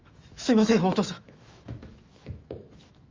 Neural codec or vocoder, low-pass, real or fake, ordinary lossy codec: autoencoder, 48 kHz, 128 numbers a frame, DAC-VAE, trained on Japanese speech; 7.2 kHz; fake; Opus, 32 kbps